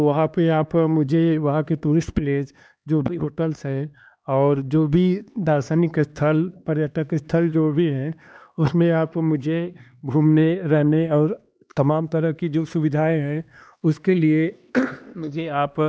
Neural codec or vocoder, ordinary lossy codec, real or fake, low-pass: codec, 16 kHz, 2 kbps, X-Codec, HuBERT features, trained on LibriSpeech; none; fake; none